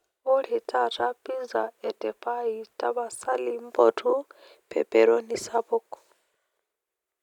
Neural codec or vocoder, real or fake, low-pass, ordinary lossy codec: none; real; 19.8 kHz; none